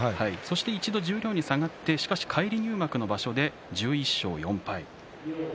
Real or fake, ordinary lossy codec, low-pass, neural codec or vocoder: real; none; none; none